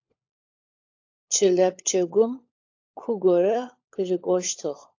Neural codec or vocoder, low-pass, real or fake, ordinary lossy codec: codec, 16 kHz, 16 kbps, FunCodec, trained on LibriTTS, 50 frames a second; 7.2 kHz; fake; AAC, 48 kbps